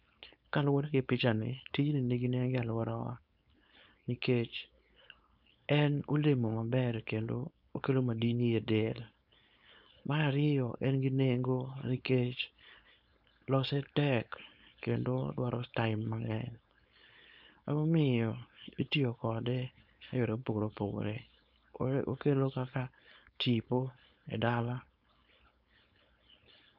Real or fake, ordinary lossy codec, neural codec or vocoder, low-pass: fake; none; codec, 16 kHz, 4.8 kbps, FACodec; 5.4 kHz